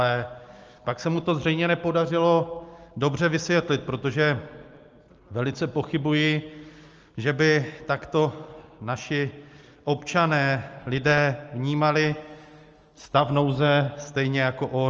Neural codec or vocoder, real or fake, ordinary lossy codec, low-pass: none; real; Opus, 24 kbps; 7.2 kHz